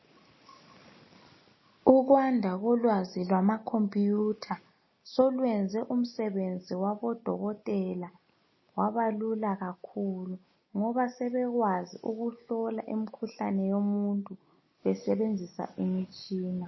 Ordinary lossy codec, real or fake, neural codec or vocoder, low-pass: MP3, 24 kbps; real; none; 7.2 kHz